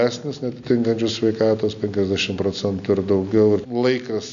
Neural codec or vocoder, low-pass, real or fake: none; 7.2 kHz; real